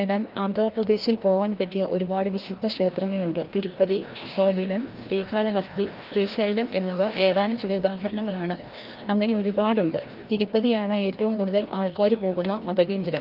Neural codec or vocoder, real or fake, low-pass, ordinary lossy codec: codec, 16 kHz, 1 kbps, FreqCodec, larger model; fake; 5.4 kHz; Opus, 24 kbps